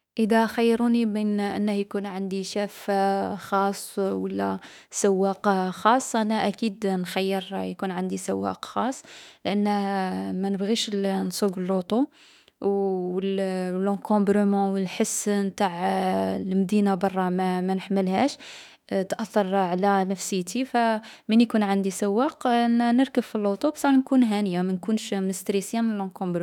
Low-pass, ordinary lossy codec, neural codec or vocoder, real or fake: 19.8 kHz; none; autoencoder, 48 kHz, 32 numbers a frame, DAC-VAE, trained on Japanese speech; fake